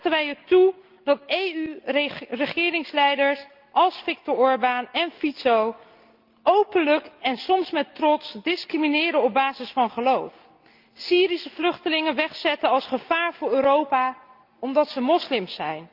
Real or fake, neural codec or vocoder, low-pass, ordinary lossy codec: real; none; 5.4 kHz; Opus, 32 kbps